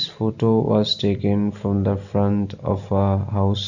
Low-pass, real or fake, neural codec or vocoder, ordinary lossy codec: 7.2 kHz; real; none; none